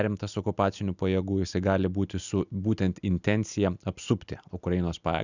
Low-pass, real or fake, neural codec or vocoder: 7.2 kHz; real; none